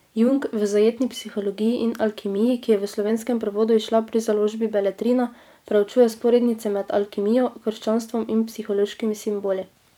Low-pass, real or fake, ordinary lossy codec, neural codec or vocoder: 19.8 kHz; fake; none; vocoder, 44.1 kHz, 128 mel bands every 512 samples, BigVGAN v2